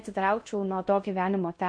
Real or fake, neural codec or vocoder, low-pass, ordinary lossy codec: fake; codec, 16 kHz in and 24 kHz out, 0.6 kbps, FocalCodec, streaming, 4096 codes; 9.9 kHz; MP3, 48 kbps